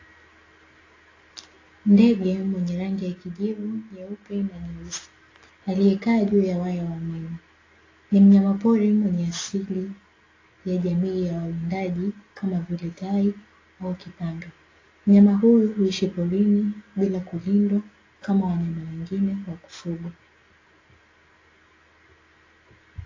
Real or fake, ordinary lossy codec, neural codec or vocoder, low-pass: real; AAC, 32 kbps; none; 7.2 kHz